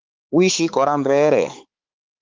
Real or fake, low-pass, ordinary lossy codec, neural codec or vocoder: fake; 7.2 kHz; Opus, 24 kbps; codec, 16 kHz, 4 kbps, X-Codec, HuBERT features, trained on balanced general audio